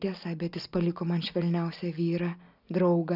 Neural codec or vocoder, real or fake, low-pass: none; real; 5.4 kHz